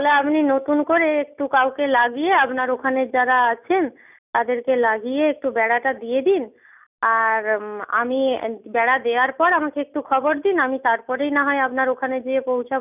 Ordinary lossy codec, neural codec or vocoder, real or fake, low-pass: none; none; real; 3.6 kHz